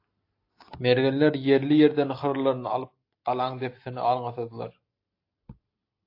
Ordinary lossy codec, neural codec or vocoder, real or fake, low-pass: AAC, 32 kbps; none; real; 5.4 kHz